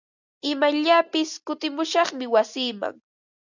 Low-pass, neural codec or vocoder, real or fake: 7.2 kHz; none; real